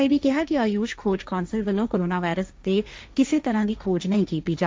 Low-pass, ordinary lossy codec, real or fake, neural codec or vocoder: none; none; fake; codec, 16 kHz, 1.1 kbps, Voila-Tokenizer